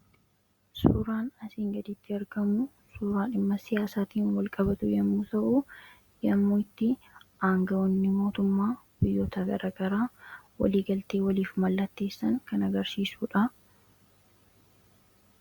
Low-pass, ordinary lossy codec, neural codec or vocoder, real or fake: 19.8 kHz; Opus, 64 kbps; none; real